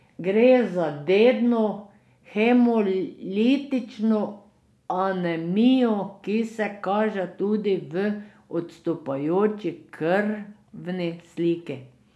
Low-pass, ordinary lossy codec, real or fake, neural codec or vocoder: none; none; real; none